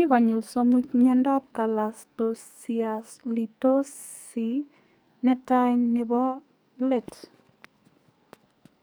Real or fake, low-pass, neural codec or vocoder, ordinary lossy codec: fake; none; codec, 44.1 kHz, 2.6 kbps, SNAC; none